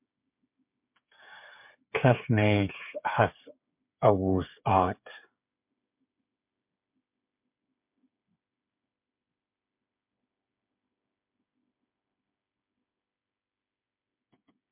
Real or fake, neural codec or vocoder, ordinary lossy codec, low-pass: fake; codec, 16 kHz, 8 kbps, FreqCodec, smaller model; MP3, 32 kbps; 3.6 kHz